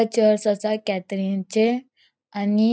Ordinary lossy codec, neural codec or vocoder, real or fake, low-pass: none; none; real; none